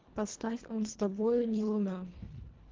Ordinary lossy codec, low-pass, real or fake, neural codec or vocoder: Opus, 16 kbps; 7.2 kHz; fake; codec, 24 kHz, 1.5 kbps, HILCodec